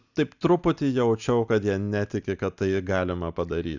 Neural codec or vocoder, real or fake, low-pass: none; real; 7.2 kHz